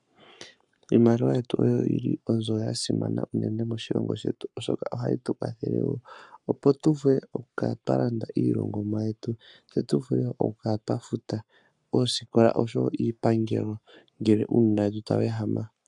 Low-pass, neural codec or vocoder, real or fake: 10.8 kHz; codec, 44.1 kHz, 7.8 kbps, Pupu-Codec; fake